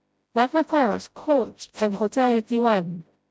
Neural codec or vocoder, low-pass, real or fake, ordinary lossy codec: codec, 16 kHz, 0.5 kbps, FreqCodec, smaller model; none; fake; none